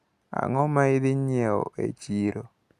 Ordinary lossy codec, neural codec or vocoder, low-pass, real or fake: Opus, 64 kbps; none; 14.4 kHz; real